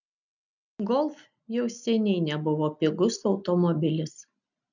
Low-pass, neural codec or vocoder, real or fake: 7.2 kHz; none; real